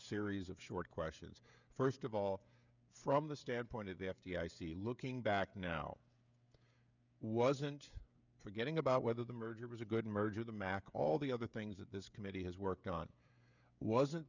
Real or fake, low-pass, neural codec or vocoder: fake; 7.2 kHz; codec, 16 kHz, 16 kbps, FreqCodec, smaller model